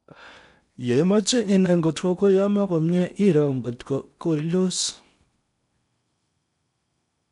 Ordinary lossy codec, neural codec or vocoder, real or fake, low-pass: none; codec, 16 kHz in and 24 kHz out, 0.8 kbps, FocalCodec, streaming, 65536 codes; fake; 10.8 kHz